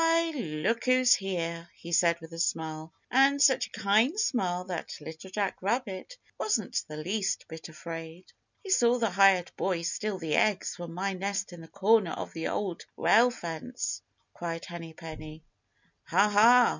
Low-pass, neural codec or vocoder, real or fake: 7.2 kHz; none; real